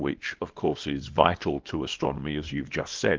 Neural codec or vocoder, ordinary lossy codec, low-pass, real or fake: codec, 24 kHz, 0.9 kbps, WavTokenizer, small release; Opus, 16 kbps; 7.2 kHz; fake